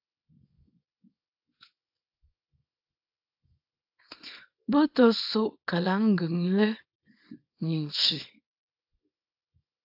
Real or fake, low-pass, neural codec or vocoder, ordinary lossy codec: fake; 5.4 kHz; codec, 24 kHz, 0.9 kbps, WavTokenizer, small release; AAC, 48 kbps